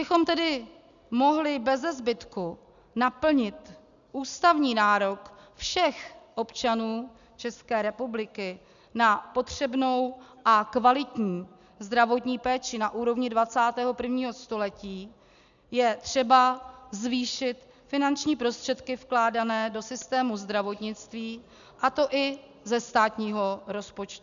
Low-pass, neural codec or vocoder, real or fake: 7.2 kHz; none; real